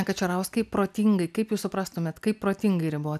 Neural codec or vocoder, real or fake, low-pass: none; real; 14.4 kHz